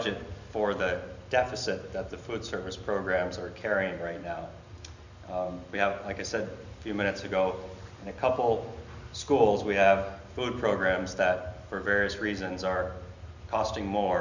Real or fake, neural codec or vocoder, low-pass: real; none; 7.2 kHz